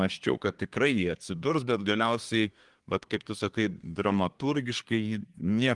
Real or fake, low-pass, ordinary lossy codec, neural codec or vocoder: fake; 10.8 kHz; Opus, 24 kbps; codec, 24 kHz, 1 kbps, SNAC